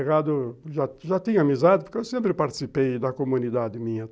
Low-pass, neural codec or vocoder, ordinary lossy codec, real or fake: none; none; none; real